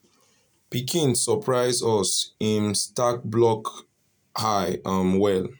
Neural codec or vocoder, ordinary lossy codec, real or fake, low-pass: none; none; real; none